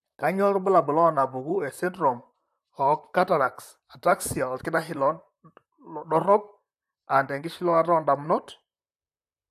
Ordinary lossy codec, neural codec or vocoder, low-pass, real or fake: AAC, 96 kbps; vocoder, 44.1 kHz, 128 mel bands, Pupu-Vocoder; 14.4 kHz; fake